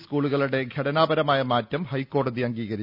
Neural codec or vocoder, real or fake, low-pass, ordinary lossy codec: none; real; 5.4 kHz; none